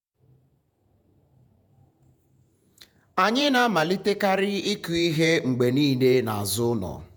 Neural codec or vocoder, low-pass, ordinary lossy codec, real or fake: vocoder, 48 kHz, 128 mel bands, Vocos; none; none; fake